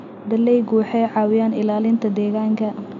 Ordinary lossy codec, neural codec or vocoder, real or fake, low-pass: none; none; real; 7.2 kHz